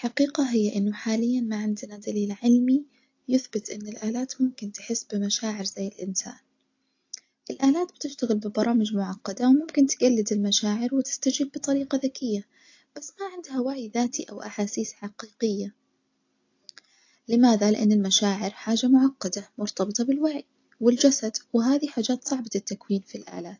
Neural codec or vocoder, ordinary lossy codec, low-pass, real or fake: none; AAC, 48 kbps; 7.2 kHz; real